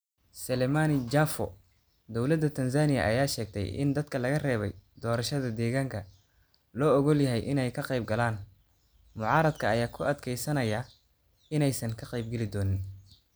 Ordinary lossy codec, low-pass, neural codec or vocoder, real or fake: none; none; none; real